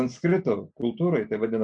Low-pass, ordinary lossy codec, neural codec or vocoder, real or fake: 9.9 kHz; MP3, 64 kbps; none; real